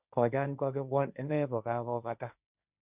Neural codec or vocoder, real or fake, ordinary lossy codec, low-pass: codec, 16 kHz, 1.1 kbps, Voila-Tokenizer; fake; none; 3.6 kHz